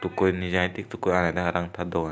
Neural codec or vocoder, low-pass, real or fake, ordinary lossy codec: none; none; real; none